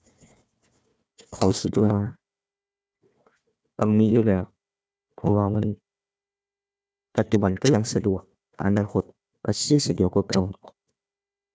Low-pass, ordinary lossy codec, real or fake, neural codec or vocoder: none; none; fake; codec, 16 kHz, 1 kbps, FunCodec, trained on Chinese and English, 50 frames a second